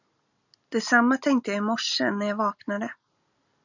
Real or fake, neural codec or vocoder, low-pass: real; none; 7.2 kHz